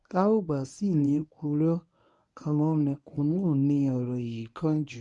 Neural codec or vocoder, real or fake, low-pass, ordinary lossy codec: codec, 24 kHz, 0.9 kbps, WavTokenizer, medium speech release version 1; fake; 10.8 kHz; none